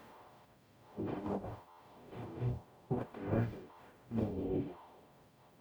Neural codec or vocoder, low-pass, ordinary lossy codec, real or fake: codec, 44.1 kHz, 0.9 kbps, DAC; none; none; fake